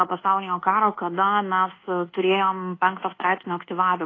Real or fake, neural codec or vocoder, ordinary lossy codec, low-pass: fake; codec, 24 kHz, 1.2 kbps, DualCodec; AAC, 32 kbps; 7.2 kHz